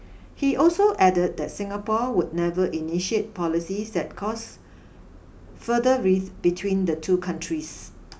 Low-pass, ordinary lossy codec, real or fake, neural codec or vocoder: none; none; real; none